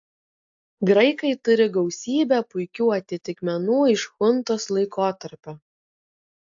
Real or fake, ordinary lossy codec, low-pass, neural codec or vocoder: real; AAC, 64 kbps; 7.2 kHz; none